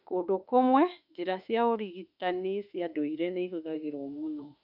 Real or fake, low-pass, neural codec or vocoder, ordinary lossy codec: fake; 5.4 kHz; autoencoder, 48 kHz, 32 numbers a frame, DAC-VAE, trained on Japanese speech; none